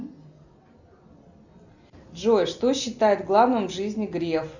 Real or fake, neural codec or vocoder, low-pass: real; none; 7.2 kHz